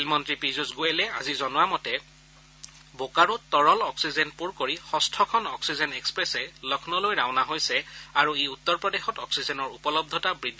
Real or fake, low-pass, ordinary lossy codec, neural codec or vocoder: real; none; none; none